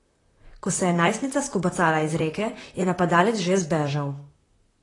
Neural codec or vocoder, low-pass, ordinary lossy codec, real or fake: vocoder, 44.1 kHz, 128 mel bands, Pupu-Vocoder; 10.8 kHz; AAC, 32 kbps; fake